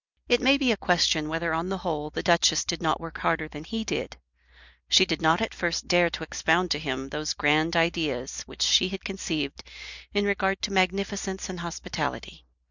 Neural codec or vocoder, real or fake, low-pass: none; real; 7.2 kHz